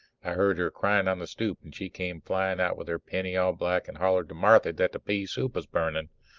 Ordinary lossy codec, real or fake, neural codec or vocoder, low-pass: Opus, 32 kbps; real; none; 7.2 kHz